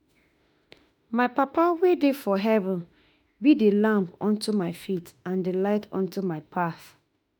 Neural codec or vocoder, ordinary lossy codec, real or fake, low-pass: autoencoder, 48 kHz, 32 numbers a frame, DAC-VAE, trained on Japanese speech; none; fake; none